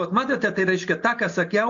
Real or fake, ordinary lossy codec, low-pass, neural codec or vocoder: real; MP3, 48 kbps; 7.2 kHz; none